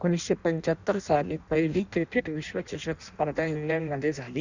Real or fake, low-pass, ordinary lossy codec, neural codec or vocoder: fake; 7.2 kHz; Opus, 64 kbps; codec, 16 kHz in and 24 kHz out, 0.6 kbps, FireRedTTS-2 codec